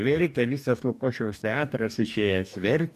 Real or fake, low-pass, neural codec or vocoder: fake; 14.4 kHz; codec, 44.1 kHz, 2.6 kbps, DAC